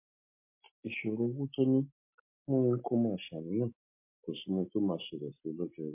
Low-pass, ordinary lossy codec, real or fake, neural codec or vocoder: 3.6 kHz; MP3, 24 kbps; real; none